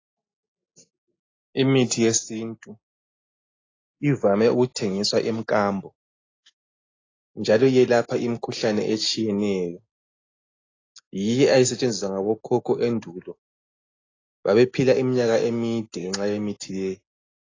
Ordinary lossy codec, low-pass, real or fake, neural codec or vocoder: AAC, 32 kbps; 7.2 kHz; real; none